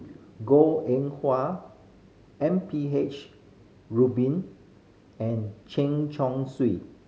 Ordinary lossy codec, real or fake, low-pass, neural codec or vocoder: none; real; none; none